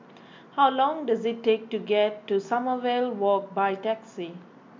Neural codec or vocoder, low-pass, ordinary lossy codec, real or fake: none; 7.2 kHz; MP3, 64 kbps; real